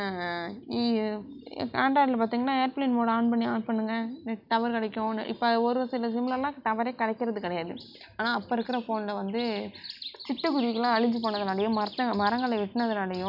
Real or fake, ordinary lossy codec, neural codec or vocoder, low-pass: real; none; none; 5.4 kHz